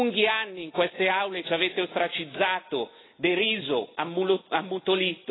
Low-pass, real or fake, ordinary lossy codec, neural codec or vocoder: 7.2 kHz; real; AAC, 16 kbps; none